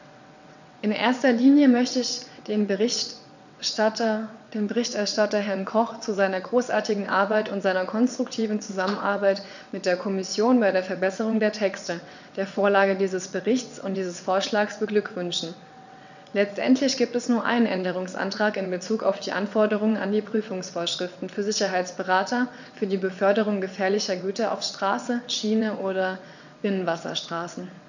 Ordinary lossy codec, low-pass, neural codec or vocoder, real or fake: none; 7.2 kHz; vocoder, 22.05 kHz, 80 mel bands, WaveNeXt; fake